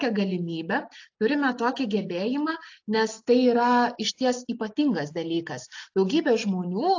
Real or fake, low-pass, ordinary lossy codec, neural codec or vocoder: real; 7.2 kHz; AAC, 48 kbps; none